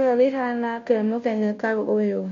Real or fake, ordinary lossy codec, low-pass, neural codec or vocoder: fake; MP3, 48 kbps; 7.2 kHz; codec, 16 kHz, 0.5 kbps, FunCodec, trained on Chinese and English, 25 frames a second